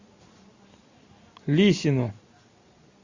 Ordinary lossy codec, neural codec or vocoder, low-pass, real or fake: Opus, 64 kbps; none; 7.2 kHz; real